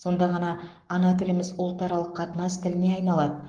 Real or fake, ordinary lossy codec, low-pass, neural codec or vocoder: fake; Opus, 24 kbps; 9.9 kHz; codec, 44.1 kHz, 7.8 kbps, Pupu-Codec